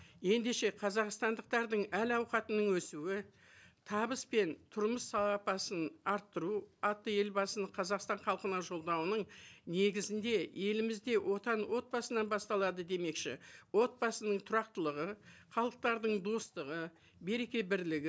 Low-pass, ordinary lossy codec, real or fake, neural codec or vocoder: none; none; real; none